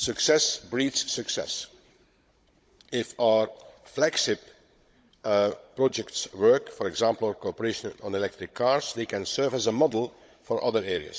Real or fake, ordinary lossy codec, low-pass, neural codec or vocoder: fake; none; none; codec, 16 kHz, 16 kbps, FunCodec, trained on Chinese and English, 50 frames a second